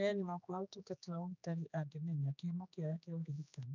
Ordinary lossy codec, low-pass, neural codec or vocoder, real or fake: none; 7.2 kHz; codec, 16 kHz, 2 kbps, X-Codec, HuBERT features, trained on general audio; fake